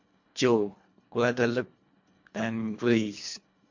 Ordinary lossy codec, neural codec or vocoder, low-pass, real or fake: MP3, 48 kbps; codec, 24 kHz, 1.5 kbps, HILCodec; 7.2 kHz; fake